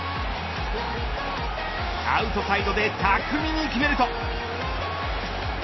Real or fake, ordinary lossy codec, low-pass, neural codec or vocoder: real; MP3, 24 kbps; 7.2 kHz; none